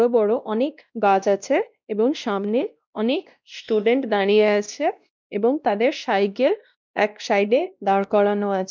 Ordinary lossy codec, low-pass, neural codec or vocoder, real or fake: none; none; codec, 16 kHz, 1 kbps, X-Codec, WavLM features, trained on Multilingual LibriSpeech; fake